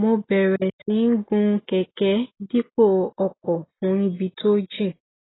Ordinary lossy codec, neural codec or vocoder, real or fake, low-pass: AAC, 16 kbps; none; real; 7.2 kHz